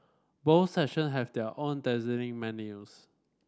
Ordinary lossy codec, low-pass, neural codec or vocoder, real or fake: none; none; none; real